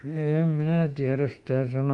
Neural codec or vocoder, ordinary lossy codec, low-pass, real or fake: autoencoder, 48 kHz, 32 numbers a frame, DAC-VAE, trained on Japanese speech; Opus, 32 kbps; 10.8 kHz; fake